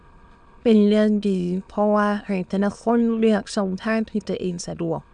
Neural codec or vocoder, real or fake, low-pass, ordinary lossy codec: autoencoder, 22.05 kHz, a latent of 192 numbers a frame, VITS, trained on many speakers; fake; 9.9 kHz; none